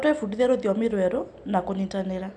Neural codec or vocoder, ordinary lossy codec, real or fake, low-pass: none; none; real; 10.8 kHz